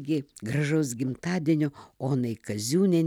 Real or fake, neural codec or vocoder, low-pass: real; none; 19.8 kHz